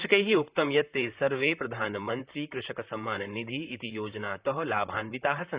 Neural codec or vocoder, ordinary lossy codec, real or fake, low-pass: vocoder, 44.1 kHz, 128 mel bands, Pupu-Vocoder; Opus, 64 kbps; fake; 3.6 kHz